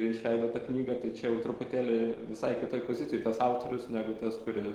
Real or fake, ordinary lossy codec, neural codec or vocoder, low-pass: fake; Opus, 16 kbps; codec, 44.1 kHz, 7.8 kbps, DAC; 19.8 kHz